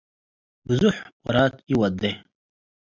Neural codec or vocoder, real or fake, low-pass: none; real; 7.2 kHz